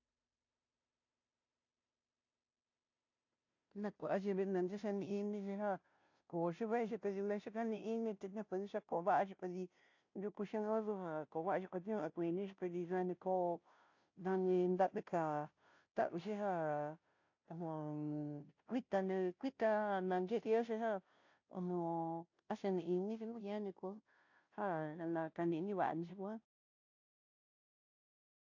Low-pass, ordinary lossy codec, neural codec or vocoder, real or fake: 7.2 kHz; AAC, 48 kbps; codec, 16 kHz, 0.5 kbps, FunCodec, trained on Chinese and English, 25 frames a second; fake